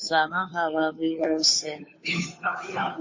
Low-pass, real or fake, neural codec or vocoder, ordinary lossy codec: 7.2 kHz; fake; codec, 16 kHz, 2 kbps, FunCodec, trained on Chinese and English, 25 frames a second; MP3, 32 kbps